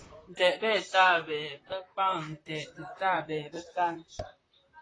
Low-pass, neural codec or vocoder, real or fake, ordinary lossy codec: 9.9 kHz; vocoder, 44.1 kHz, 128 mel bands, Pupu-Vocoder; fake; AAC, 32 kbps